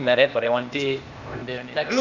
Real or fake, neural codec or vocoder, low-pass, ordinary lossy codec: fake; codec, 16 kHz, 0.8 kbps, ZipCodec; 7.2 kHz; none